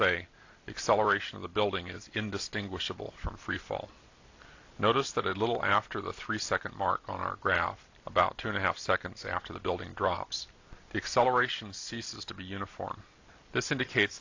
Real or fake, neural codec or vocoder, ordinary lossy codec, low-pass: real; none; AAC, 48 kbps; 7.2 kHz